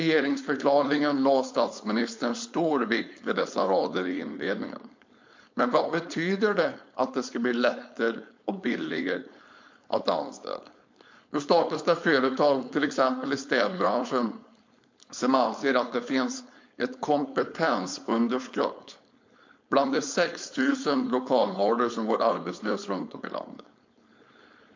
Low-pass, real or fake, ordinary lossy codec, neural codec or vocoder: 7.2 kHz; fake; MP3, 48 kbps; codec, 16 kHz, 4.8 kbps, FACodec